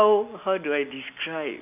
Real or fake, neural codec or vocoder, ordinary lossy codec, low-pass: real; none; none; 3.6 kHz